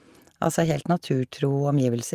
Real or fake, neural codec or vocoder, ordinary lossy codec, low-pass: real; none; Opus, 32 kbps; 14.4 kHz